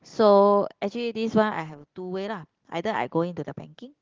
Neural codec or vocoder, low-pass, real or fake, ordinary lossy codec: none; 7.2 kHz; real; Opus, 16 kbps